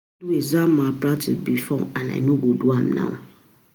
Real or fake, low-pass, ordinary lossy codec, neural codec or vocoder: real; none; none; none